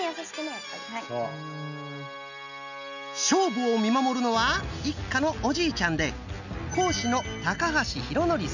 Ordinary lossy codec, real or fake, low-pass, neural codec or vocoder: none; real; 7.2 kHz; none